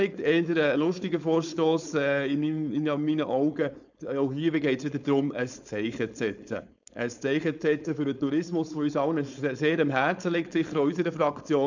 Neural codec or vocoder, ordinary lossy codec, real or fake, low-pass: codec, 16 kHz, 4.8 kbps, FACodec; none; fake; 7.2 kHz